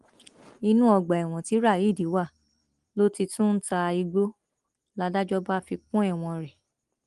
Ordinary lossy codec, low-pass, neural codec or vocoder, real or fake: Opus, 24 kbps; 10.8 kHz; none; real